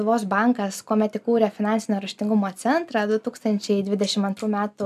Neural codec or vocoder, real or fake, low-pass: none; real; 14.4 kHz